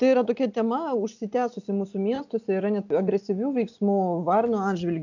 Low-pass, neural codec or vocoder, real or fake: 7.2 kHz; none; real